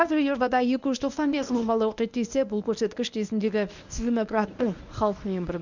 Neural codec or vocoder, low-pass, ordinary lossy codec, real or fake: codec, 24 kHz, 0.9 kbps, WavTokenizer, medium speech release version 1; 7.2 kHz; none; fake